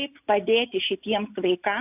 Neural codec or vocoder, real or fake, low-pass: none; real; 3.6 kHz